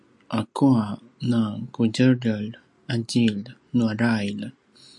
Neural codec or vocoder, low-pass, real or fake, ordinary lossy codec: none; 10.8 kHz; real; MP3, 64 kbps